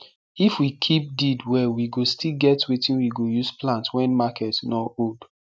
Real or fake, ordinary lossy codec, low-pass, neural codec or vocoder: real; none; none; none